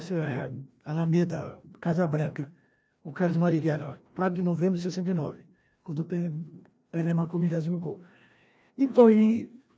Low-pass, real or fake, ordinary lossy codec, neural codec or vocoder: none; fake; none; codec, 16 kHz, 1 kbps, FreqCodec, larger model